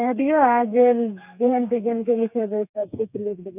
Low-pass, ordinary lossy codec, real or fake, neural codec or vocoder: 3.6 kHz; none; fake; codec, 32 kHz, 1.9 kbps, SNAC